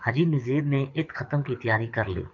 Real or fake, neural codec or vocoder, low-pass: fake; codec, 24 kHz, 6 kbps, HILCodec; 7.2 kHz